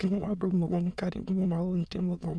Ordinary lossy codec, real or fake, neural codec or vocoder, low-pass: none; fake; autoencoder, 22.05 kHz, a latent of 192 numbers a frame, VITS, trained on many speakers; none